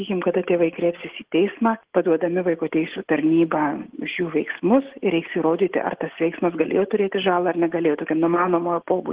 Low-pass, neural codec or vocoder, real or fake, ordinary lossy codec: 3.6 kHz; none; real; Opus, 16 kbps